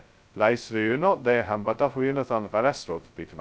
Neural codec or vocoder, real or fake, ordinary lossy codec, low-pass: codec, 16 kHz, 0.2 kbps, FocalCodec; fake; none; none